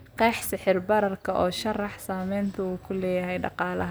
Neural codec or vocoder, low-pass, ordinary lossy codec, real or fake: none; none; none; real